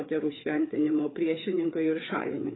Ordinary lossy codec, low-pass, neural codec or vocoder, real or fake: AAC, 16 kbps; 7.2 kHz; codec, 16 kHz, 4 kbps, FreqCodec, larger model; fake